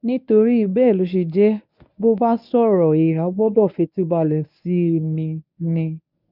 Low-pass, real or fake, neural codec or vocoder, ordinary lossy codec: 5.4 kHz; fake; codec, 24 kHz, 0.9 kbps, WavTokenizer, medium speech release version 1; none